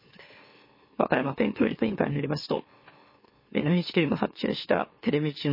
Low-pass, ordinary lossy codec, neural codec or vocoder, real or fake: 5.4 kHz; MP3, 24 kbps; autoencoder, 44.1 kHz, a latent of 192 numbers a frame, MeloTTS; fake